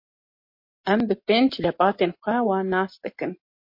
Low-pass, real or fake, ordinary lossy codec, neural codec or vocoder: 5.4 kHz; real; MP3, 32 kbps; none